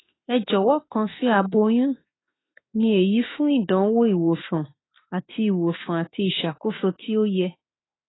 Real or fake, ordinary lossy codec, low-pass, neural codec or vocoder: fake; AAC, 16 kbps; 7.2 kHz; autoencoder, 48 kHz, 32 numbers a frame, DAC-VAE, trained on Japanese speech